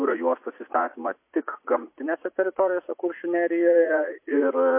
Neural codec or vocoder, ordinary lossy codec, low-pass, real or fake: vocoder, 44.1 kHz, 80 mel bands, Vocos; MP3, 24 kbps; 3.6 kHz; fake